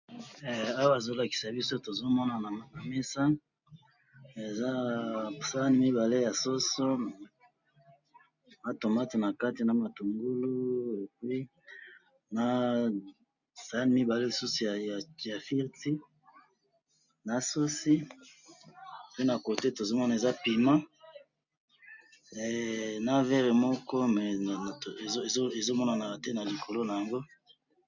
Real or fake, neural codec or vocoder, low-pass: real; none; 7.2 kHz